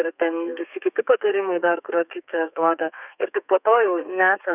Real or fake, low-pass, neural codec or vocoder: fake; 3.6 kHz; codec, 32 kHz, 1.9 kbps, SNAC